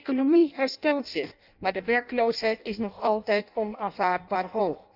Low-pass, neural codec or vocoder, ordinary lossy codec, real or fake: 5.4 kHz; codec, 16 kHz in and 24 kHz out, 0.6 kbps, FireRedTTS-2 codec; none; fake